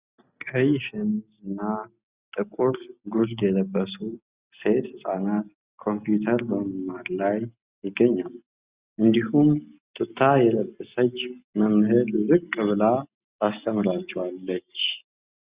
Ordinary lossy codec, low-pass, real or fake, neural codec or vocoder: Opus, 64 kbps; 3.6 kHz; real; none